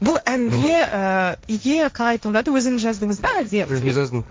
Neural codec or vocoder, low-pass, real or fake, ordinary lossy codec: codec, 16 kHz, 1.1 kbps, Voila-Tokenizer; none; fake; none